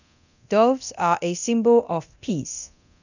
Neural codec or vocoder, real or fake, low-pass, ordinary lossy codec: codec, 24 kHz, 0.9 kbps, DualCodec; fake; 7.2 kHz; none